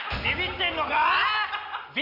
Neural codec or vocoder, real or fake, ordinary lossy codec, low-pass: none; real; none; 5.4 kHz